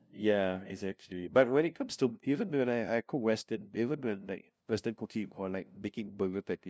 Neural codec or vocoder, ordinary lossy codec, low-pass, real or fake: codec, 16 kHz, 0.5 kbps, FunCodec, trained on LibriTTS, 25 frames a second; none; none; fake